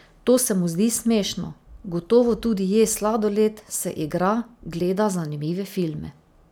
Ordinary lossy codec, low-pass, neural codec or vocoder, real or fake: none; none; none; real